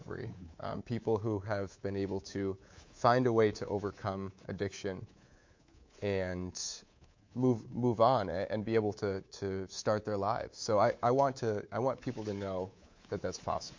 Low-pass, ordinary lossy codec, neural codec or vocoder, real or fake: 7.2 kHz; MP3, 48 kbps; codec, 24 kHz, 3.1 kbps, DualCodec; fake